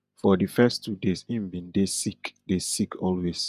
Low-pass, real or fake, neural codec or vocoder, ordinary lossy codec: 14.4 kHz; fake; vocoder, 48 kHz, 128 mel bands, Vocos; none